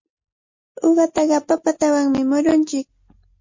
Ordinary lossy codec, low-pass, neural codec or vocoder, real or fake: MP3, 32 kbps; 7.2 kHz; none; real